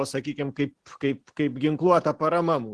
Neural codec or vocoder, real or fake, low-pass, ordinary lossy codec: none; real; 10.8 kHz; Opus, 16 kbps